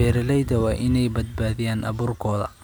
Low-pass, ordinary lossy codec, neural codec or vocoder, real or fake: none; none; none; real